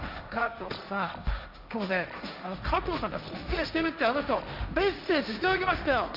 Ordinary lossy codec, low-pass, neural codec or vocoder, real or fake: none; 5.4 kHz; codec, 16 kHz, 1.1 kbps, Voila-Tokenizer; fake